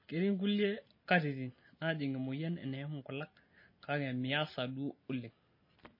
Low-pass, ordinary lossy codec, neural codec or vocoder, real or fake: 5.4 kHz; MP3, 24 kbps; none; real